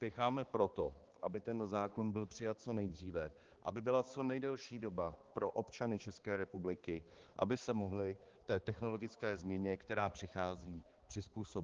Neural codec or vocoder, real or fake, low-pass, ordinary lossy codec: codec, 16 kHz, 2 kbps, X-Codec, HuBERT features, trained on balanced general audio; fake; 7.2 kHz; Opus, 16 kbps